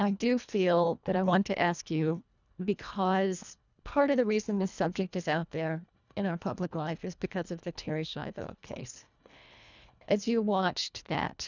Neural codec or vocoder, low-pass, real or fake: codec, 24 kHz, 1.5 kbps, HILCodec; 7.2 kHz; fake